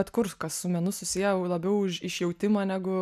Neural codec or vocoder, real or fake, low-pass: none; real; 14.4 kHz